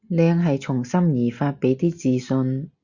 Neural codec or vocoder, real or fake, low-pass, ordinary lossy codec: none; real; 7.2 kHz; Opus, 64 kbps